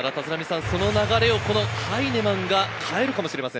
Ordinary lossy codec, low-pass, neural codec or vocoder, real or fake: none; none; none; real